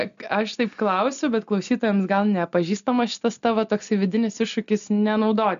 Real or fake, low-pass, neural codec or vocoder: real; 7.2 kHz; none